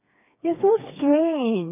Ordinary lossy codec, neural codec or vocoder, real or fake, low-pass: none; codec, 16 kHz, 4 kbps, FreqCodec, smaller model; fake; 3.6 kHz